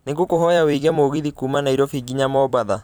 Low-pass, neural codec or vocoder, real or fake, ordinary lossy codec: none; vocoder, 44.1 kHz, 128 mel bands every 256 samples, BigVGAN v2; fake; none